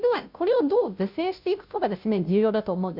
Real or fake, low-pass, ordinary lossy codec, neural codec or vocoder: fake; 5.4 kHz; none; codec, 16 kHz, 0.5 kbps, FunCodec, trained on Chinese and English, 25 frames a second